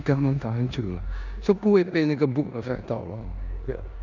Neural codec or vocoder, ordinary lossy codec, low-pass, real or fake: codec, 16 kHz in and 24 kHz out, 0.9 kbps, LongCat-Audio-Codec, four codebook decoder; none; 7.2 kHz; fake